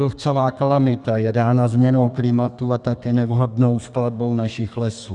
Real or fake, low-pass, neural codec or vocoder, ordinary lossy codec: fake; 10.8 kHz; codec, 32 kHz, 1.9 kbps, SNAC; Opus, 64 kbps